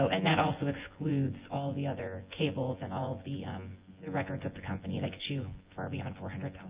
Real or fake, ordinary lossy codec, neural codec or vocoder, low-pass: fake; Opus, 24 kbps; vocoder, 24 kHz, 100 mel bands, Vocos; 3.6 kHz